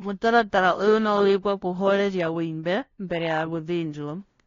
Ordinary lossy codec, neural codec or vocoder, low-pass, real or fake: AAC, 32 kbps; codec, 16 kHz, 0.5 kbps, FunCodec, trained on LibriTTS, 25 frames a second; 7.2 kHz; fake